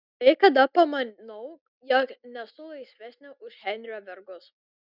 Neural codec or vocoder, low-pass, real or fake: none; 5.4 kHz; real